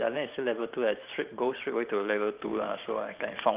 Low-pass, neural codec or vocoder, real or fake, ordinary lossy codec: 3.6 kHz; vocoder, 44.1 kHz, 128 mel bands every 512 samples, BigVGAN v2; fake; Opus, 24 kbps